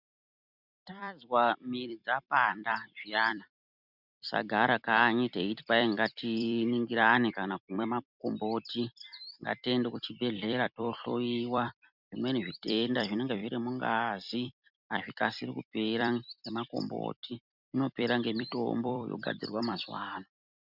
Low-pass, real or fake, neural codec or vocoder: 5.4 kHz; fake; vocoder, 44.1 kHz, 128 mel bands every 256 samples, BigVGAN v2